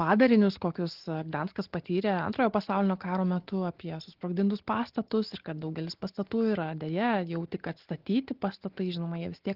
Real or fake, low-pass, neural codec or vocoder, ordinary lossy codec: real; 5.4 kHz; none; Opus, 16 kbps